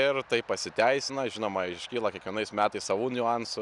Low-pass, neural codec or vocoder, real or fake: 10.8 kHz; none; real